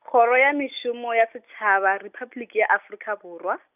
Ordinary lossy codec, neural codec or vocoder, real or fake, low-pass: none; none; real; 3.6 kHz